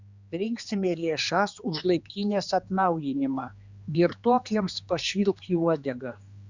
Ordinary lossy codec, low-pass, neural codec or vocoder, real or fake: Opus, 64 kbps; 7.2 kHz; codec, 16 kHz, 2 kbps, X-Codec, HuBERT features, trained on general audio; fake